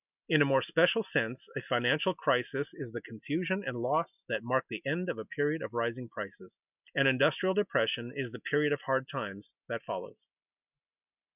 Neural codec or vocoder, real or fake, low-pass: none; real; 3.6 kHz